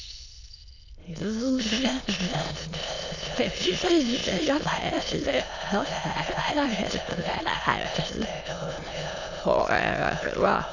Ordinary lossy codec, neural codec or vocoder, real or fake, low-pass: none; autoencoder, 22.05 kHz, a latent of 192 numbers a frame, VITS, trained on many speakers; fake; 7.2 kHz